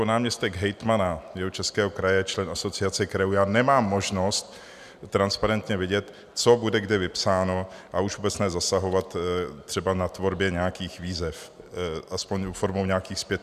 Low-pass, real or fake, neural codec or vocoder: 14.4 kHz; real; none